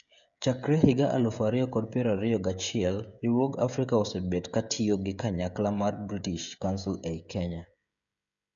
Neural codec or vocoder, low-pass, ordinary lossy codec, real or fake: codec, 16 kHz, 16 kbps, FreqCodec, smaller model; 7.2 kHz; none; fake